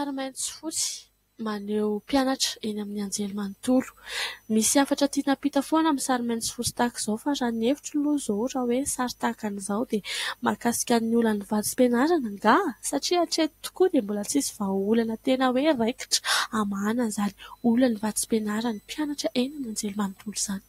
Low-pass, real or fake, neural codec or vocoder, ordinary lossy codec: 19.8 kHz; real; none; AAC, 48 kbps